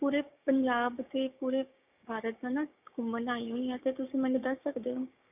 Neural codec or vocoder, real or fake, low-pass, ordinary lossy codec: codec, 16 kHz, 6 kbps, DAC; fake; 3.6 kHz; none